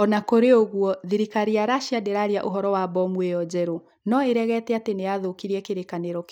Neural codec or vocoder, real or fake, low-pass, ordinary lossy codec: none; real; 19.8 kHz; none